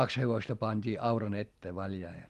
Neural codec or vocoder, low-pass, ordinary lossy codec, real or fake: none; 14.4 kHz; Opus, 24 kbps; real